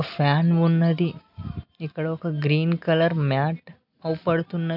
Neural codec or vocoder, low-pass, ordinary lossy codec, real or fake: none; 5.4 kHz; none; real